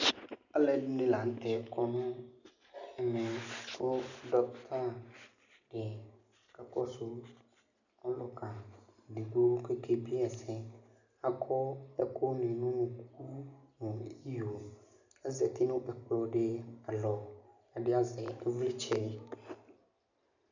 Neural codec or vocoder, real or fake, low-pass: none; real; 7.2 kHz